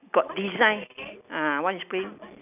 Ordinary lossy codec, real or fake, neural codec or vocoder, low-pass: none; real; none; 3.6 kHz